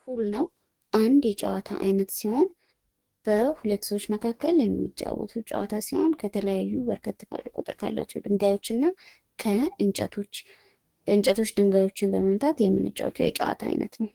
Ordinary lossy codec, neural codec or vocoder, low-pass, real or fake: Opus, 24 kbps; codec, 44.1 kHz, 2.6 kbps, DAC; 19.8 kHz; fake